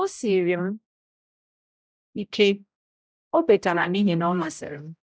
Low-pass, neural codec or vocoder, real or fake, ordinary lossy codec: none; codec, 16 kHz, 0.5 kbps, X-Codec, HuBERT features, trained on general audio; fake; none